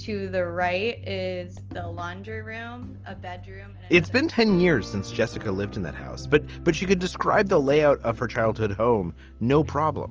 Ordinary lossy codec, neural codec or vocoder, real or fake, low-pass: Opus, 24 kbps; none; real; 7.2 kHz